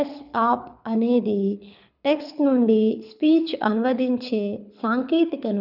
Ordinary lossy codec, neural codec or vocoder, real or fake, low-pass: none; codec, 24 kHz, 6 kbps, HILCodec; fake; 5.4 kHz